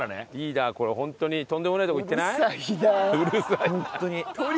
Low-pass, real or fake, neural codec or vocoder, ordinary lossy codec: none; real; none; none